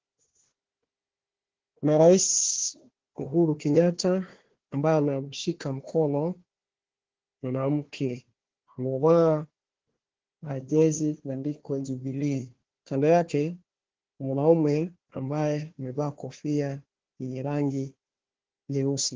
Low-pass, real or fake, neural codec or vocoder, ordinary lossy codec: 7.2 kHz; fake; codec, 16 kHz, 1 kbps, FunCodec, trained on Chinese and English, 50 frames a second; Opus, 16 kbps